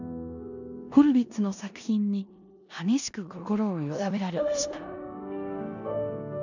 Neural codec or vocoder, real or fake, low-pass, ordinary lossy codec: codec, 16 kHz in and 24 kHz out, 0.9 kbps, LongCat-Audio-Codec, four codebook decoder; fake; 7.2 kHz; none